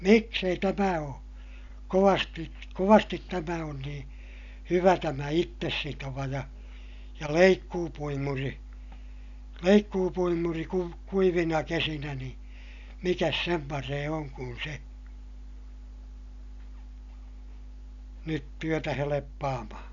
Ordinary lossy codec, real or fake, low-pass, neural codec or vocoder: none; real; 7.2 kHz; none